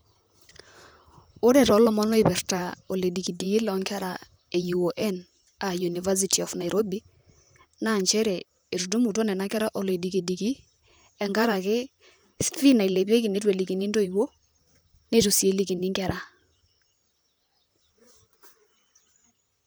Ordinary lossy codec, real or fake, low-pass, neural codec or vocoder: none; fake; none; vocoder, 44.1 kHz, 128 mel bands, Pupu-Vocoder